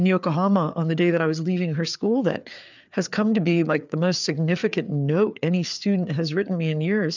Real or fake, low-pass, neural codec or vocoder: fake; 7.2 kHz; codec, 16 kHz, 4 kbps, FreqCodec, larger model